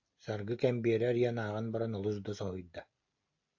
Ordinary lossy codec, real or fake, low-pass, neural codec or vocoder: AAC, 48 kbps; real; 7.2 kHz; none